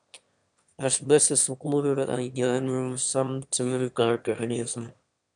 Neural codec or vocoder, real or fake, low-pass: autoencoder, 22.05 kHz, a latent of 192 numbers a frame, VITS, trained on one speaker; fake; 9.9 kHz